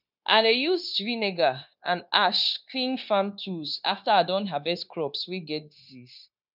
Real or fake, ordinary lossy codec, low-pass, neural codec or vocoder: fake; none; 5.4 kHz; codec, 16 kHz, 0.9 kbps, LongCat-Audio-Codec